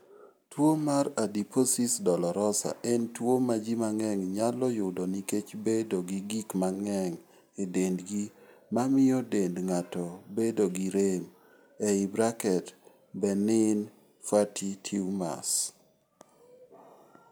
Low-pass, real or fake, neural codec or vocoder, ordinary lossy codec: none; real; none; none